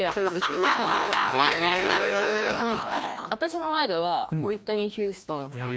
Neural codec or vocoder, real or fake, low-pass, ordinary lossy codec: codec, 16 kHz, 1 kbps, FreqCodec, larger model; fake; none; none